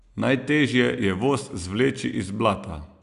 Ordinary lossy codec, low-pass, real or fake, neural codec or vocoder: Opus, 64 kbps; 10.8 kHz; real; none